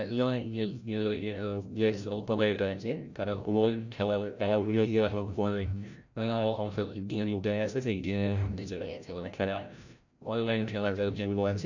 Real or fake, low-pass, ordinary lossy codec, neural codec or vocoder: fake; 7.2 kHz; none; codec, 16 kHz, 0.5 kbps, FreqCodec, larger model